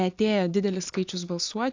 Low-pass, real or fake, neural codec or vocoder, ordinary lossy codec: 7.2 kHz; real; none; AAC, 48 kbps